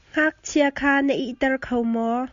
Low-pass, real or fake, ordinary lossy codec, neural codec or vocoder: 7.2 kHz; real; Opus, 64 kbps; none